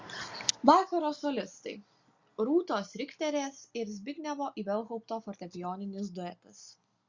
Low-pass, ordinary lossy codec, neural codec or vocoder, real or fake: 7.2 kHz; Opus, 64 kbps; none; real